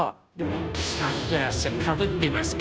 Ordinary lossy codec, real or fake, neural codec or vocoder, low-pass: none; fake; codec, 16 kHz, 0.5 kbps, FunCodec, trained on Chinese and English, 25 frames a second; none